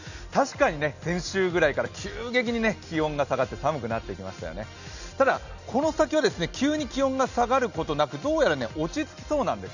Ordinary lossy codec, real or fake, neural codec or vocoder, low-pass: none; real; none; 7.2 kHz